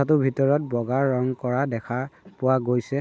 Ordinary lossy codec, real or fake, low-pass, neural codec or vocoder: none; real; none; none